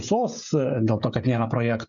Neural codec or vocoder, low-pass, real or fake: codec, 16 kHz, 16 kbps, FreqCodec, smaller model; 7.2 kHz; fake